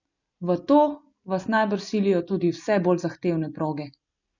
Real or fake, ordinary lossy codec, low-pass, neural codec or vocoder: real; none; 7.2 kHz; none